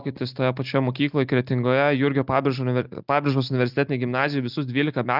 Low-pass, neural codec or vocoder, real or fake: 5.4 kHz; none; real